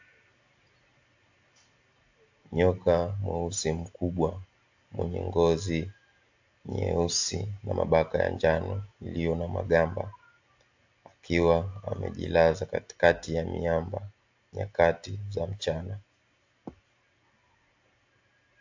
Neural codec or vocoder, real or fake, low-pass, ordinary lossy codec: none; real; 7.2 kHz; AAC, 48 kbps